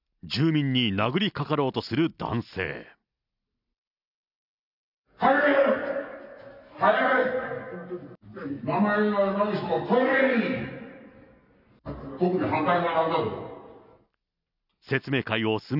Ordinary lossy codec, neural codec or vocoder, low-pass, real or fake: none; none; 5.4 kHz; real